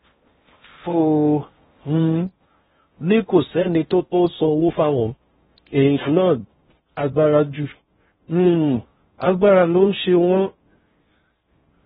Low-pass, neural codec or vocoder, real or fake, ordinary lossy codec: 10.8 kHz; codec, 16 kHz in and 24 kHz out, 0.6 kbps, FocalCodec, streaming, 4096 codes; fake; AAC, 16 kbps